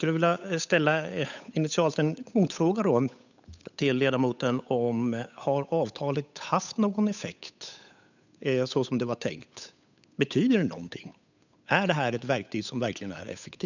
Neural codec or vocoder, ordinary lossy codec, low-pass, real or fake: codec, 16 kHz, 8 kbps, FunCodec, trained on Chinese and English, 25 frames a second; none; 7.2 kHz; fake